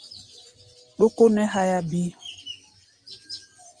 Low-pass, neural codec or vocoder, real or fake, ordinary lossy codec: 9.9 kHz; none; real; Opus, 32 kbps